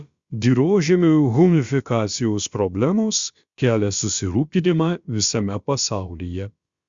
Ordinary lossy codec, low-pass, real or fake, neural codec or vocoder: Opus, 64 kbps; 7.2 kHz; fake; codec, 16 kHz, about 1 kbps, DyCAST, with the encoder's durations